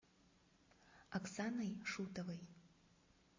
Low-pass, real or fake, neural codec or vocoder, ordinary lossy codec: 7.2 kHz; real; none; MP3, 32 kbps